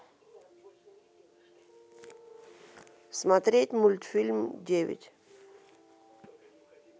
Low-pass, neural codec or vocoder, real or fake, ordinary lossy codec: none; none; real; none